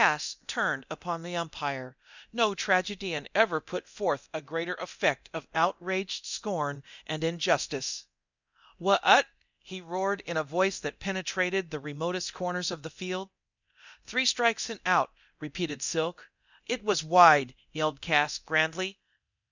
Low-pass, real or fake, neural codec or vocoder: 7.2 kHz; fake; codec, 24 kHz, 0.9 kbps, DualCodec